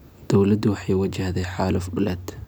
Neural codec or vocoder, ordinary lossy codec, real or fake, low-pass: none; none; real; none